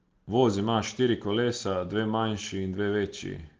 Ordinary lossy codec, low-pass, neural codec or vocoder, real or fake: Opus, 16 kbps; 7.2 kHz; none; real